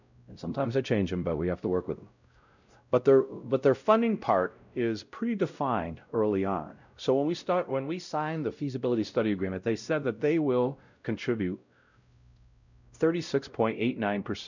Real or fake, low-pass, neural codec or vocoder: fake; 7.2 kHz; codec, 16 kHz, 0.5 kbps, X-Codec, WavLM features, trained on Multilingual LibriSpeech